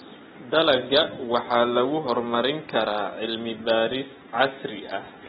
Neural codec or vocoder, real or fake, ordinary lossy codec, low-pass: none; real; AAC, 16 kbps; 7.2 kHz